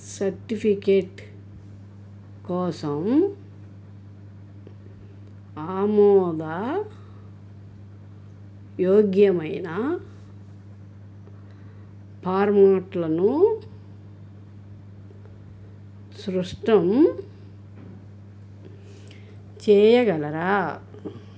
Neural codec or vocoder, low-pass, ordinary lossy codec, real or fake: none; none; none; real